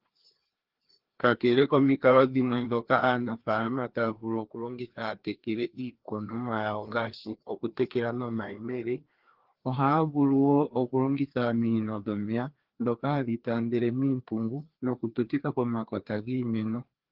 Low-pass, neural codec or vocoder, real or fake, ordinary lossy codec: 5.4 kHz; codec, 16 kHz, 2 kbps, FreqCodec, larger model; fake; Opus, 16 kbps